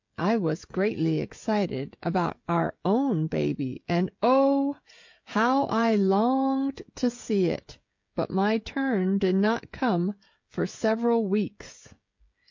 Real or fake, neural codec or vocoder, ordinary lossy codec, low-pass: fake; codec, 16 kHz, 16 kbps, FreqCodec, smaller model; MP3, 48 kbps; 7.2 kHz